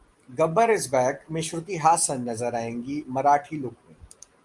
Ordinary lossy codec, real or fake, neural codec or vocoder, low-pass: Opus, 32 kbps; real; none; 10.8 kHz